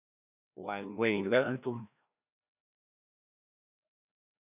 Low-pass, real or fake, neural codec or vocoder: 3.6 kHz; fake; codec, 16 kHz, 1 kbps, FreqCodec, larger model